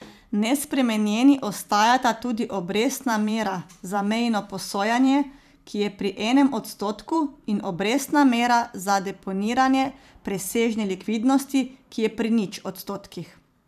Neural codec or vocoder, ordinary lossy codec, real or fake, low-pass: vocoder, 44.1 kHz, 128 mel bands every 512 samples, BigVGAN v2; none; fake; 14.4 kHz